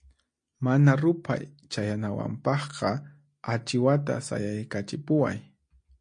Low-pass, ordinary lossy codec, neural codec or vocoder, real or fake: 9.9 kHz; MP3, 48 kbps; none; real